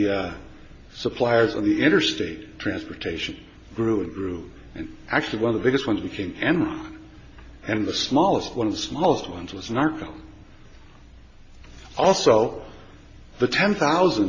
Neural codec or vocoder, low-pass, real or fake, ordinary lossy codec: none; 7.2 kHz; real; MP3, 32 kbps